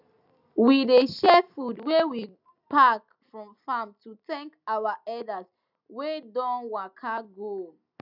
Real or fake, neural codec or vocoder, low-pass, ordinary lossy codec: real; none; 5.4 kHz; none